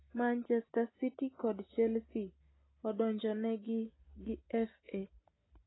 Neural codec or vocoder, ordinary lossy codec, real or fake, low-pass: none; AAC, 16 kbps; real; 7.2 kHz